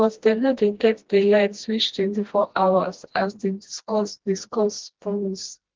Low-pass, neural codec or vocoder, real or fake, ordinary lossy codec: 7.2 kHz; codec, 16 kHz, 1 kbps, FreqCodec, smaller model; fake; Opus, 16 kbps